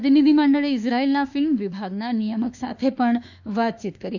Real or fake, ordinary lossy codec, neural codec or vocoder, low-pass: fake; none; autoencoder, 48 kHz, 32 numbers a frame, DAC-VAE, trained on Japanese speech; 7.2 kHz